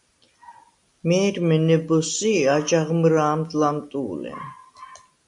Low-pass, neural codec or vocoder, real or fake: 10.8 kHz; none; real